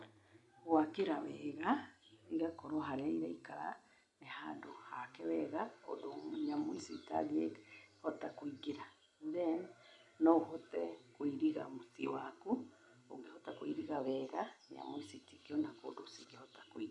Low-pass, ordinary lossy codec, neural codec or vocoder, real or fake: 10.8 kHz; none; none; real